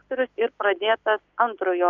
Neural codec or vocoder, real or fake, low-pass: none; real; 7.2 kHz